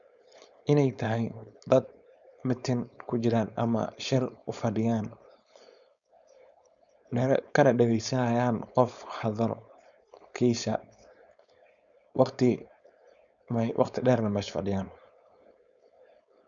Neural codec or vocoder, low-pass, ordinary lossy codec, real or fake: codec, 16 kHz, 4.8 kbps, FACodec; 7.2 kHz; none; fake